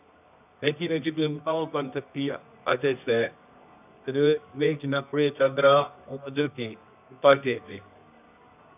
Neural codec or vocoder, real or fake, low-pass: codec, 24 kHz, 0.9 kbps, WavTokenizer, medium music audio release; fake; 3.6 kHz